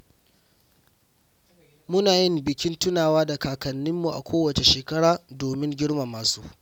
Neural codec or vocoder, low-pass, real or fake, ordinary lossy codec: none; 19.8 kHz; real; none